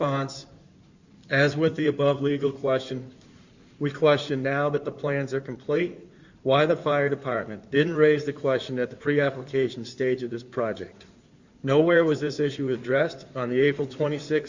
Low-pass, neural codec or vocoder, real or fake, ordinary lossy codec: 7.2 kHz; codec, 16 kHz in and 24 kHz out, 2.2 kbps, FireRedTTS-2 codec; fake; Opus, 64 kbps